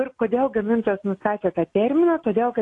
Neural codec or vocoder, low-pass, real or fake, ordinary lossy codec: none; 10.8 kHz; real; AAC, 48 kbps